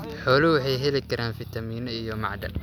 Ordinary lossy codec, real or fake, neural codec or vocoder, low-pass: none; real; none; 19.8 kHz